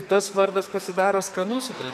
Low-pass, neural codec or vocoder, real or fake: 14.4 kHz; codec, 44.1 kHz, 2.6 kbps, SNAC; fake